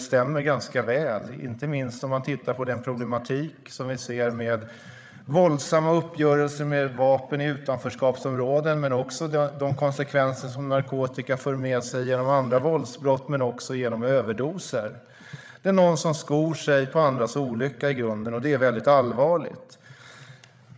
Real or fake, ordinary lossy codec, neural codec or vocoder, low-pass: fake; none; codec, 16 kHz, 8 kbps, FreqCodec, larger model; none